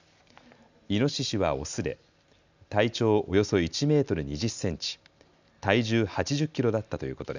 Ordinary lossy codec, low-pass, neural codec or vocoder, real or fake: none; 7.2 kHz; none; real